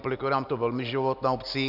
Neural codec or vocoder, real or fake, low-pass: none; real; 5.4 kHz